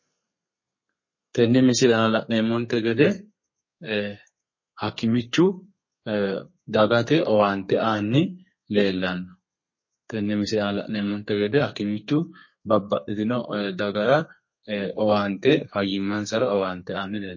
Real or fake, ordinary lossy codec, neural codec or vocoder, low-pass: fake; MP3, 32 kbps; codec, 32 kHz, 1.9 kbps, SNAC; 7.2 kHz